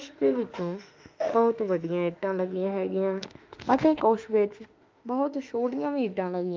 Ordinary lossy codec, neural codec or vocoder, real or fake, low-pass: Opus, 24 kbps; autoencoder, 48 kHz, 32 numbers a frame, DAC-VAE, trained on Japanese speech; fake; 7.2 kHz